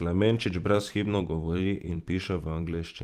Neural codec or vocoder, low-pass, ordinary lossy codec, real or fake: vocoder, 44.1 kHz, 128 mel bands every 512 samples, BigVGAN v2; 14.4 kHz; Opus, 32 kbps; fake